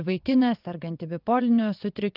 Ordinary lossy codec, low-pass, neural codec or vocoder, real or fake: Opus, 24 kbps; 5.4 kHz; vocoder, 22.05 kHz, 80 mel bands, WaveNeXt; fake